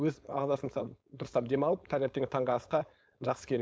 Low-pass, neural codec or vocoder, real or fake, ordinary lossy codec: none; codec, 16 kHz, 4.8 kbps, FACodec; fake; none